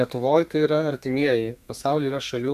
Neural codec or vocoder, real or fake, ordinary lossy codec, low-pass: codec, 32 kHz, 1.9 kbps, SNAC; fake; MP3, 96 kbps; 14.4 kHz